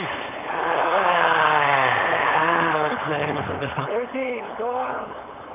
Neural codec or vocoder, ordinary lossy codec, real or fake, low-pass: codec, 16 kHz, 4.8 kbps, FACodec; none; fake; 3.6 kHz